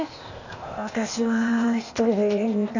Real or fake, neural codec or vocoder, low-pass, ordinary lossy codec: fake; codec, 16 kHz, 0.8 kbps, ZipCodec; 7.2 kHz; none